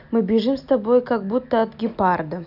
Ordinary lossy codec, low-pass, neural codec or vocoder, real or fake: none; 5.4 kHz; none; real